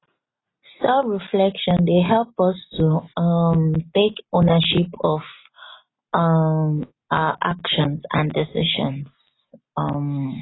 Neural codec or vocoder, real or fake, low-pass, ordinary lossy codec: none; real; 7.2 kHz; AAC, 16 kbps